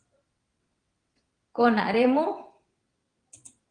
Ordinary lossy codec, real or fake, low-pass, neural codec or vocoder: Opus, 24 kbps; fake; 9.9 kHz; vocoder, 22.05 kHz, 80 mel bands, WaveNeXt